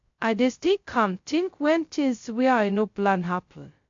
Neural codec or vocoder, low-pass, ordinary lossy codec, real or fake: codec, 16 kHz, 0.2 kbps, FocalCodec; 7.2 kHz; MP3, 48 kbps; fake